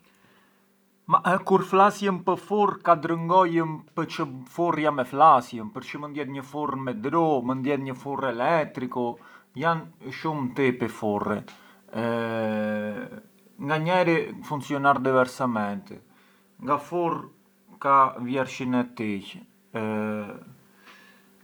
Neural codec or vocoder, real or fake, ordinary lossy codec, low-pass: none; real; none; none